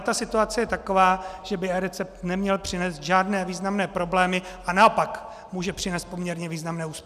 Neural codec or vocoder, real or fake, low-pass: none; real; 14.4 kHz